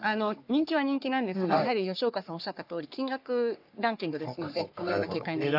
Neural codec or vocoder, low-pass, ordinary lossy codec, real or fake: codec, 44.1 kHz, 3.4 kbps, Pupu-Codec; 5.4 kHz; none; fake